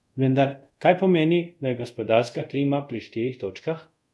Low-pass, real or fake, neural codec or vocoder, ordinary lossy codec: none; fake; codec, 24 kHz, 0.5 kbps, DualCodec; none